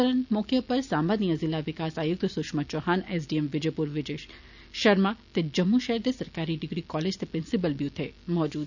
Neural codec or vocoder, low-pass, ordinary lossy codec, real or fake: none; 7.2 kHz; none; real